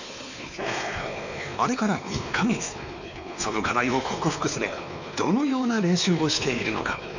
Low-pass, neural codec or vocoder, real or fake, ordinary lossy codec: 7.2 kHz; codec, 16 kHz, 2 kbps, X-Codec, WavLM features, trained on Multilingual LibriSpeech; fake; none